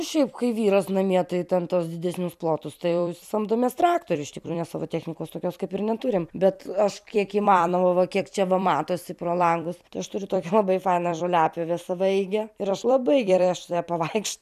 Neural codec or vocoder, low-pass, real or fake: vocoder, 44.1 kHz, 128 mel bands every 256 samples, BigVGAN v2; 14.4 kHz; fake